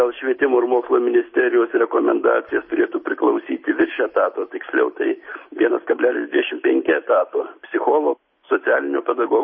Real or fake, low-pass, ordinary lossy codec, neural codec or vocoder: real; 7.2 kHz; MP3, 24 kbps; none